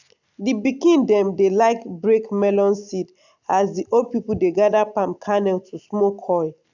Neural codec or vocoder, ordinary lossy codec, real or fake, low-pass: none; none; real; 7.2 kHz